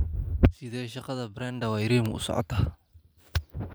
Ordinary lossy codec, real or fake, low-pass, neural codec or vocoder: none; fake; none; vocoder, 44.1 kHz, 128 mel bands every 512 samples, BigVGAN v2